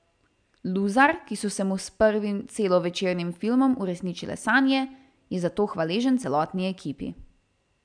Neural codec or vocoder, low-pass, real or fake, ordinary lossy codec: none; 9.9 kHz; real; none